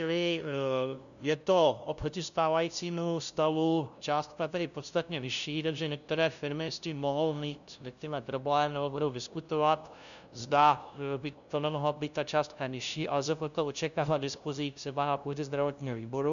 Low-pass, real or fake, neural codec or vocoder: 7.2 kHz; fake; codec, 16 kHz, 0.5 kbps, FunCodec, trained on LibriTTS, 25 frames a second